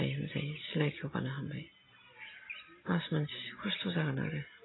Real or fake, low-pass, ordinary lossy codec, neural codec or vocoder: real; 7.2 kHz; AAC, 16 kbps; none